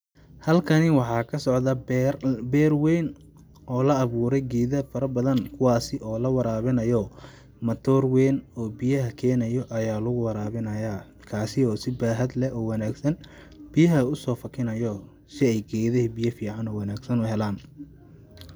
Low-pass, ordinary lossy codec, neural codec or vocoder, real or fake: none; none; none; real